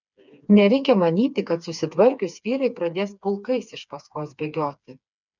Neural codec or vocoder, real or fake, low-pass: codec, 16 kHz, 4 kbps, FreqCodec, smaller model; fake; 7.2 kHz